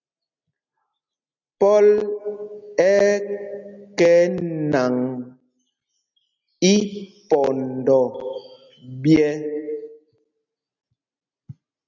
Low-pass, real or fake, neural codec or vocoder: 7.2 kHz; real; none